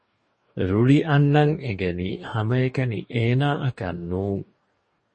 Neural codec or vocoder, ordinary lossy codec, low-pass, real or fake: codec, 44.1 kHz, 2.6 kbps, DAC; MP3, 32 kbps; 10.8 kHz; fake